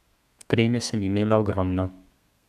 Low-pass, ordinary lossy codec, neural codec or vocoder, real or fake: 14.4 kHz; none; codec, 32 kHz, 1.9 kbps, SNAC; fake